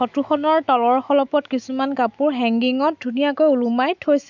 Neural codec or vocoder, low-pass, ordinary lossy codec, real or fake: autoencoder, 48 kHz, 128 numbers a frame, DAC-VAE, trained on Japanese speech; 7.2 kHz; none; fake